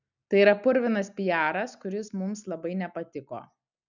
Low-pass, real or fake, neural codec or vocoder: 7.2 kHz; real; none